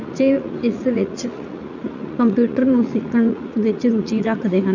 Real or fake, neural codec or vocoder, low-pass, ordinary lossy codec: fake; vocoder, 44.1 kHz, 80 mel bands, Vocos; 7.2 kHz; none